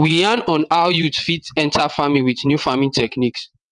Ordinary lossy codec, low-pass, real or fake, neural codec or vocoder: none; 9.9 kHz; fake; vocoder, 22.05 kHz, 80 mel bands, WaveNeXt